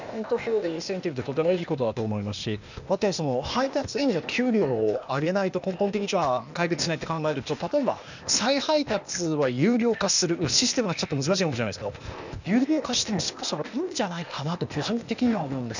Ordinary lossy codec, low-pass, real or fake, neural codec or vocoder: none; 7.2 kHz; fake; codec, 16 kHz, 0.8 kbps, ZipCodec